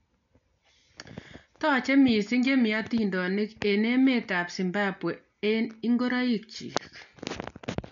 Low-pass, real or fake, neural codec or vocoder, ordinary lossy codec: 7.2 kHz; real; none; none